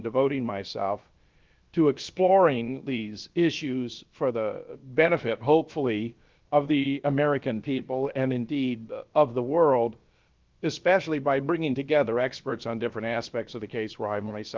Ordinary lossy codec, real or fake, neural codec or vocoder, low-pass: Opus, 32 kbps; fake; codec, 16 kHz, about 1 kbps, DyCAST, with the encoder's durations; 7.2 kHz